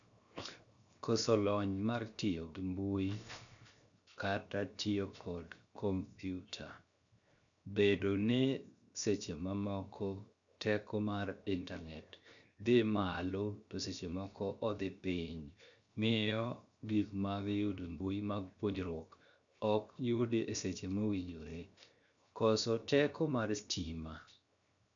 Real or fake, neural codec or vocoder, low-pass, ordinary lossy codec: fake; codec, 16 kHz, 0.7 kbps, FocalCodec; 7.2 kHz; none